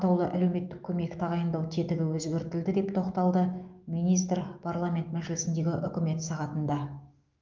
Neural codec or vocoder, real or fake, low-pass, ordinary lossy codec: autoencoder, 48 kHz, 128 numbers a frame, DAC-VAE, trained on Japanese speech; fake; 7.2 kHz; Opus, 24 kbps